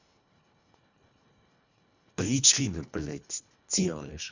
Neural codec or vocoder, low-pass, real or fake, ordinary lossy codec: codec, 24 kHz, 1.5 kbps, HILCodec; 7.2 kHz; fake; none